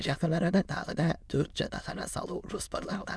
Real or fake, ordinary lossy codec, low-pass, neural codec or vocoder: fake; none; none; autoencoder, 22.05 kHz, a latent of 192 numbers a frame, VITS, trained on many speakers